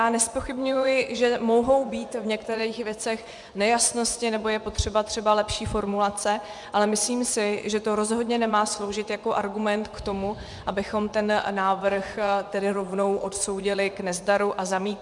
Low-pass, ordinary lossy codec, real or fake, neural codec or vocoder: 10.8 kHz; MP3, 96 kbps; fake; vocoder, 24 kHz, 100 mel bands, Vocos